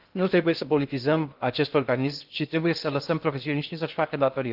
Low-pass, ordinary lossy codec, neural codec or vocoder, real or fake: 5.4 kHz; Opus, 32 kbps; codec, 16 kHz in and 24 kHz out, 0.6 kbps, FocalCodec, streaming, 2048 codes; fake